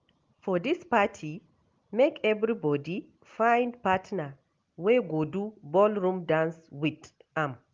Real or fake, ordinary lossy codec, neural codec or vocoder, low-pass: real; Opus, 32 kbps; none; 7.2 kHz